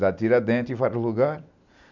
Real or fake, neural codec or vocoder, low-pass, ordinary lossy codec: real; none; 7.2 kHz; none